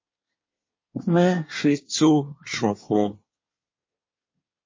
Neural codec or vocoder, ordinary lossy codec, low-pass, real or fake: codec, 24 kHz, 1 kbps, SNAC; MP3, 32 kbps; 7.2 kHz; fake